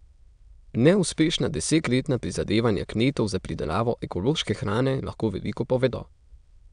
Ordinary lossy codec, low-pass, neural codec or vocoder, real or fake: none; 9.9 kHz; autoencoder, 22.05 kHz, a latent of 192 numbers a frame, VITS, trained on many speakers; fake